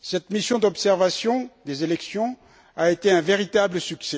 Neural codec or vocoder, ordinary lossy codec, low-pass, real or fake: none; none; none; real